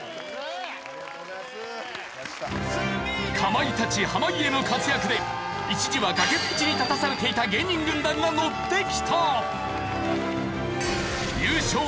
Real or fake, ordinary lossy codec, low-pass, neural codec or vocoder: real; none; none; none